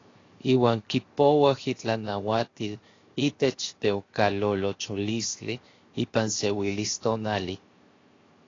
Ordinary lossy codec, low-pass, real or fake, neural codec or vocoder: AAC, 32 kbps; 7.2 kHz; fake; codec, 16 kHz, 0.7 kbps, FocalCodec